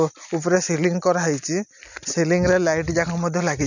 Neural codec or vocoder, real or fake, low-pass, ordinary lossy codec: none; real; 7.2 kHz; none